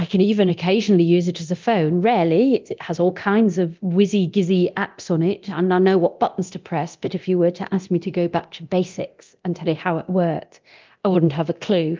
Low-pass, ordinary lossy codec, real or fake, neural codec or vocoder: 7.2 kHz; Opus, 24 kbps; fake; codec, 24 kHz, 0.9 kbps, DualCodec